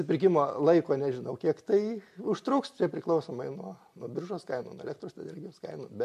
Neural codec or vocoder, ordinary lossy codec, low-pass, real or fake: none; MP3, 64 kbps; 14.4 kHz; real